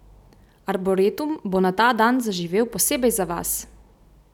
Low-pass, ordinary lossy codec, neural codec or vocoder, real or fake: 19.8 kHz; none; none; real